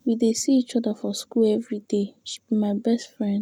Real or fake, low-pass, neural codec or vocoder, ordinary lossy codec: real; 19.8 kHz; none; none